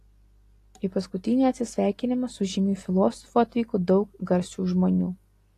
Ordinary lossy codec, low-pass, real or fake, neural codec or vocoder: AAC, 48 kbps; 14.4 kHz; real; none